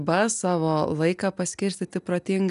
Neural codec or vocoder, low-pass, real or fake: none; 10.8 kHz; real